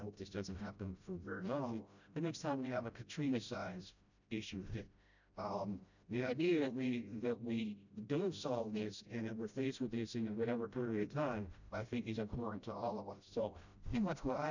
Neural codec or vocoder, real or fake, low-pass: codec, 16 kHz, 0.5 kbps, FreqCodec, smaller model; fake; 7.2 kHz